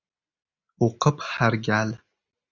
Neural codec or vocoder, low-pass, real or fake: none; 7.2 kHz; real